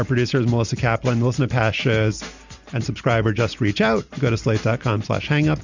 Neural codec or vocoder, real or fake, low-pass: none; real; 7.2 kHz